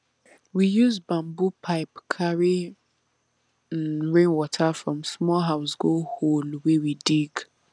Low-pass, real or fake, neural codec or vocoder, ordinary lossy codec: 9.9 kHz; real; none; none